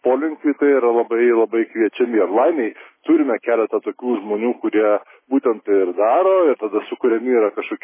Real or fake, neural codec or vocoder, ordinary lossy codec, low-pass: real; none; MP3, 16 kbps; 3.6 kHz